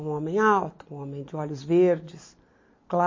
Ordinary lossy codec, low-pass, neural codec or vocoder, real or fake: MP3, 32 kbps; 7.2 kHz; none; real